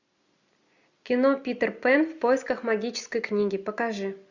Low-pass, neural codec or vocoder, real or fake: 7.2 kHz; none; real